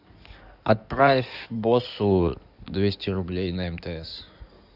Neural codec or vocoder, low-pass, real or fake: codec, 16 kHz in and 24 kHz out, 2.2 kbps, FireRedTTS-2 codec; 5.4 kHz; fake